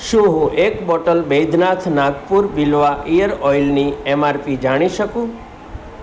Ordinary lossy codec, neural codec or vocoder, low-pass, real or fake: none; none; none; real